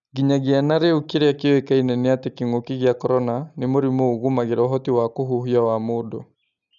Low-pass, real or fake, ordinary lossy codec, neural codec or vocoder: 7.2 kHz; real; none; none